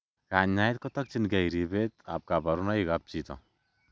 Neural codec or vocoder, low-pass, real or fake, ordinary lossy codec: none; none; real; none